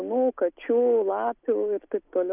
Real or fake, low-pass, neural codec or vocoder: real; 3.6 kHz; none